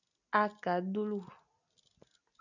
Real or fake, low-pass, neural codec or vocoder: real; 7.2 kHz; none